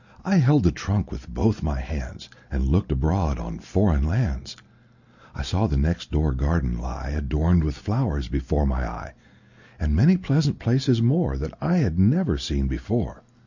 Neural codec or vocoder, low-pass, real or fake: none; 7.2 kHz; real